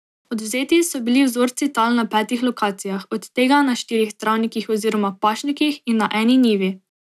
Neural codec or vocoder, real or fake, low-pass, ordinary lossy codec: none; real; 14.4 kHz; none